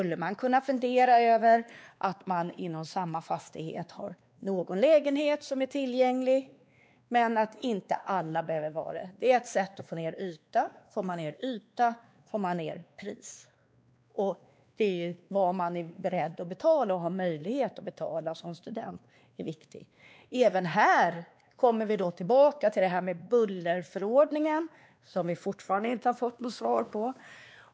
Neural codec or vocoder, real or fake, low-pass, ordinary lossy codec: codec, 16 kHz, 2 kbps, X-Codec, WavLM features, trained on Multilingual LibriSpeech; fake; none; none